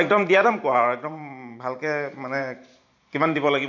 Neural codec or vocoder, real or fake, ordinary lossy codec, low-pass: vocoder, 44.1 kHz, 128 mel bands every 512 samples, BigVGAN v2; fake; none; 7.2 kHz